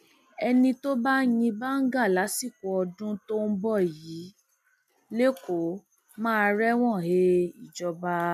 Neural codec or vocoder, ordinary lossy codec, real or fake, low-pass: none; none; real; 14.4 kHz